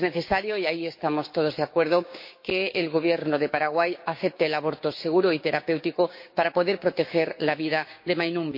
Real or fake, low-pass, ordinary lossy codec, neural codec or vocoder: fake; 5.4 kHz; MP3, 32 kbps; codec, 16 kHz, 6 kbps, DAC